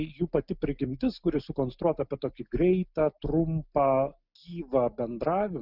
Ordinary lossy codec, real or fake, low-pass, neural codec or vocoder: Opus, 64 kbps; real; 5.4 kHz; none